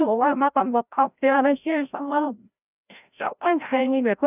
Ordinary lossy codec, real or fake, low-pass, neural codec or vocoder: none; fake; 3.6 kHz; codec, 16 kHz, 0.5 kbps, FreqCodec, larger model